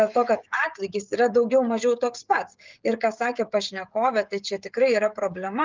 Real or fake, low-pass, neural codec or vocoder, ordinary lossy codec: fake; 7.2 kHz; codec, 16 kHz, 16 kbps, FreqCodec, smaller model; Opus, 24 kbps